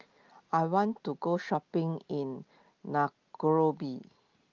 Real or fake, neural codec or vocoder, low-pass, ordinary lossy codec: real; none; 7.2 kHz; Opus, 24 kbps